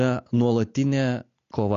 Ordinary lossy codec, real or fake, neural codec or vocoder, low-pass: MP3, 48 kbps; real; none; 7.2 kHz